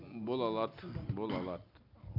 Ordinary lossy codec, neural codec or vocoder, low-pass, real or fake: none; none; 5.4 kHz; real